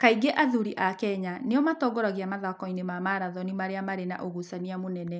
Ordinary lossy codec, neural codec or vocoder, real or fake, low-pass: none; none; real; none